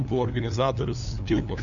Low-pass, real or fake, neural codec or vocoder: 7.2 kHz; fake; codec, 16 kHz, 2 kbps, FunCodec, trained on LibriTTS, 25 frames a second